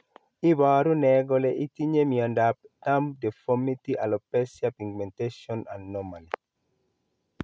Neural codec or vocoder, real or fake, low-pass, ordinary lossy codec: none; real; none; none